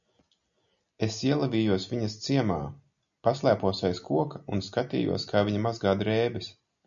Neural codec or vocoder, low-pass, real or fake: none; 7.2 kHz; real